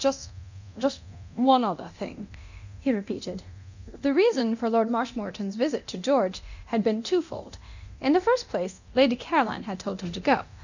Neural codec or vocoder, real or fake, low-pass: codec, 24 kHz, 0.9 kbps, DualCodec; fake; 7.2 kHz